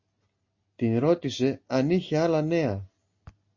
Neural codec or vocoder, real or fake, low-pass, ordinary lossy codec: none; real; 7.2 kHz; MP3, 32 kbps